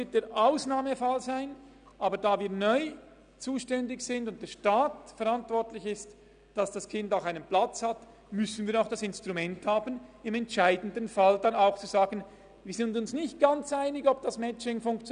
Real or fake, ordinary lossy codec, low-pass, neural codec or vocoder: real; none; 9.9 kHz; none